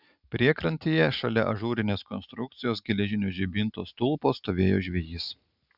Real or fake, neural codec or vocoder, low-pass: real; none; 5.4 kHz